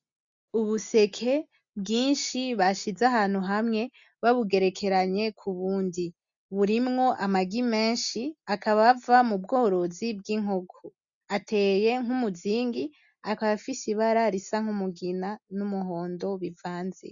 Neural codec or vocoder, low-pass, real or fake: none; 7.2 kHz; real